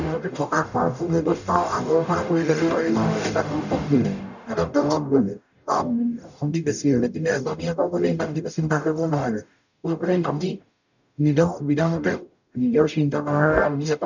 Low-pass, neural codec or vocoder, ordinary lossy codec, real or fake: 7.2 kHz; codec, 44.1 kHz, 0.9 kbps, DAC; none; fake